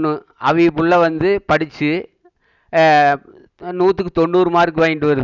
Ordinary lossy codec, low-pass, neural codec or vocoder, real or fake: none; 7.2 kHz; none; real